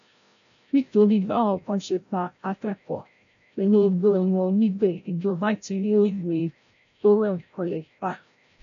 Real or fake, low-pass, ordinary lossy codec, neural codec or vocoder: fake; 7.2 kHz; none; codec, 16 kHz, 0.5 kbps, FreqCodec, larger model